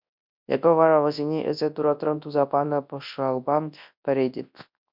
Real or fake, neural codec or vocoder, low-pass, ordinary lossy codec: fake; codec, 24 kHz, 0.9 kbps, WavTokenizer, large speech release; 5.4 kHz; MP3, 48 kbps